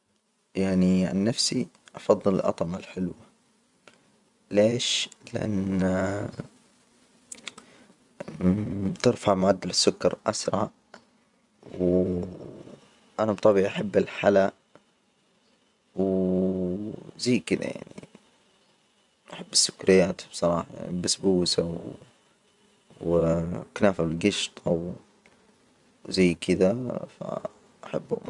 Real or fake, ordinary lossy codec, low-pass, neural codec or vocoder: fake; none; 10.8 kHz; vocoder, 24 kHz, 100 mel bands, Vocos